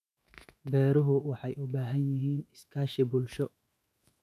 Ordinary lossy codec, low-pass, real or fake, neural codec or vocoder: none; 14.4 kHz; fake; autoencoder, 48 kHz, 128 numbers a frame, DAC-VAE, trained on Japanese speech